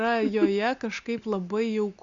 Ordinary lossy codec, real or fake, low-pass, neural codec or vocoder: Opus, 64 kbps; real; 7.2 kHz; none